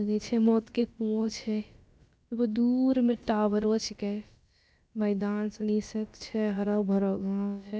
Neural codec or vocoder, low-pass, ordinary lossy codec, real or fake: codec, 16 kHz, about 1 kbps, DyCAST, with the encoder's durations; none; none; fake